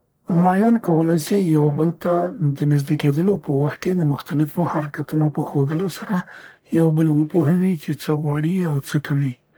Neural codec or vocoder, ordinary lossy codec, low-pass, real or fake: codec, 44.1 kHz, 1.7 kbps, Pupu-Codec; none; none; fake